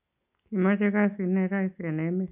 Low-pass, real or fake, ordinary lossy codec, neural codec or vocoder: 3.6 kHz; real; none; none